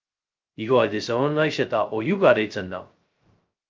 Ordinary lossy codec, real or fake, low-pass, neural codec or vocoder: Opus, 24 kbps; fake; 7.2 kHz; codec, 16 kHz, 0.2 kbps, FocalCodec